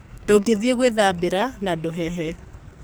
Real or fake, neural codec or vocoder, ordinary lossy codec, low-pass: fake; codec, 44.1 kHz, 2.6 kbps, SNAC; none; none